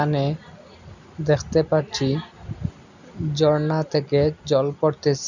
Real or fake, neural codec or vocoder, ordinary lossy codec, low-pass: real; none; none; 7.2 kHz